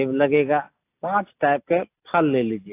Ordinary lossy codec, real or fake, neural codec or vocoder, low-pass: AAC, 24 kbps; real; none; 3.6 kHz